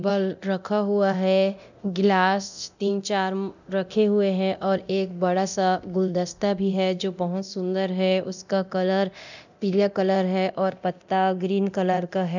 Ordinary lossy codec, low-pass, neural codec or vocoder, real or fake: none; 7.2 kHz; codec, 24 kHz, 0.9 kbps, DualCodec; fake